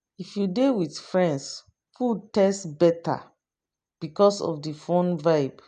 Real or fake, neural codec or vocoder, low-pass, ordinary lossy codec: real; none; 9.9 kHz; none